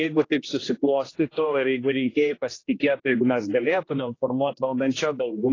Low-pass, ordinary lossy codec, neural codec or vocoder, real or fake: 7.2 kHz; AAC, 32 kbps; codec, 16 kHz, 1 kbps, X-Codec, HuBERT features, trained on general audio; fake